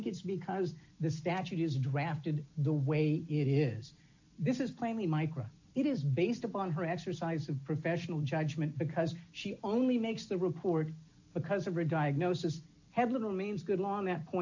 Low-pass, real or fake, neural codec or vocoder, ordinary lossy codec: 7.2 kHz; real; none; AAC, 48 kbps